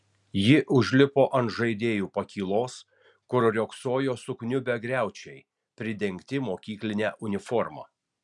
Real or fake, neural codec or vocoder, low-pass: real; none; 10.8 kHz